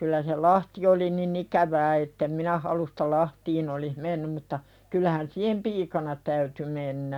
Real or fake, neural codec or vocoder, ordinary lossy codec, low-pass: real; none; none; 19.8 kHz